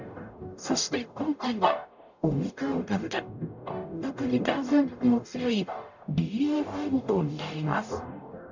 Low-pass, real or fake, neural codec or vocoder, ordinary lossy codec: 7.2 kHz; fake; codec, 44.1 kHz, 0.9 kbps, DAC; none